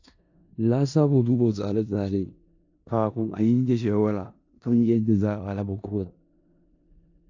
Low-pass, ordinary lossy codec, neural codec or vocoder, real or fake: 7.2 kHz; AAC, 48 kbps; codec, 16 kHz in and 24 kHz out, 0.4 kbps, LongCat-Audio-Codec, four codebook decoder; fake